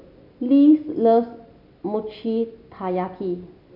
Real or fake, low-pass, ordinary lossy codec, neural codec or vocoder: real; 5.4 kHz; none; none